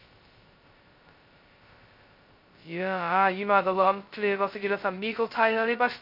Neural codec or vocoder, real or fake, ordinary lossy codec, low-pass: codec, 16 kHz, 0.2 kbps, FocalCodec; fake; MP3, 32 kbps; 5.4 kHz